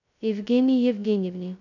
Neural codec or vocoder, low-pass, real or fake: codec, 16 kHz, 0.2 kbps, FocalCodec; 7.2 kHz; fake